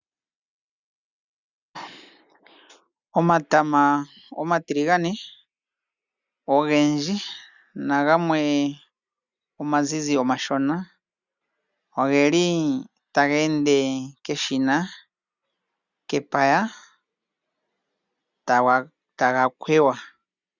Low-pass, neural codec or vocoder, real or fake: 7.2 kHz; none; real